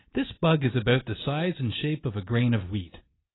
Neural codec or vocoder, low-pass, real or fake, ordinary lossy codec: none; 7.2 kHz; real; AAC, 16 kbps